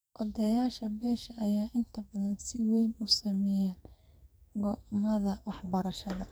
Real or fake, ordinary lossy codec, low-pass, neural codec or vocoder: fake; none; none; codec, 44.1 kHz, 2.6 kbps, SNAC